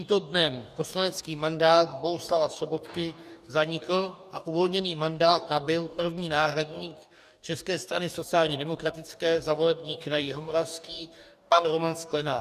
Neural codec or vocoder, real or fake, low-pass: codec, 44.1 kHz, 2.6 kbps, DAC; fake; 14.4 kHz